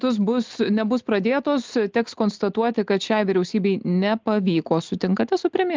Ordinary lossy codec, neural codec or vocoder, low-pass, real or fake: Opus, 32 kbps; none; 7.2 kHz; real